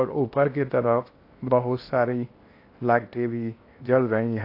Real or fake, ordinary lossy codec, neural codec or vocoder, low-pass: fake; AAC, 32 kbps; codec, 16 kHz in and 24 kHz out, 0.8 kbps, FocalCodec, streaming, 65536 codes; 5.4 kHz